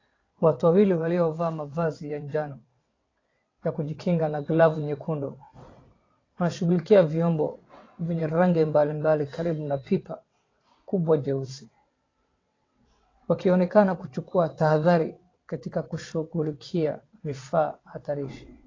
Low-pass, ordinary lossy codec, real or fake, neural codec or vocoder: 7.2 kHz; AAC, 32 kbps; fake; vocoder, 22.05 kHz, 80 mel bands, WaveNeXt